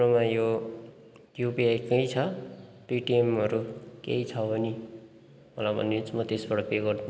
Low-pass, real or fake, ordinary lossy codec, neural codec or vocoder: none; real; none; none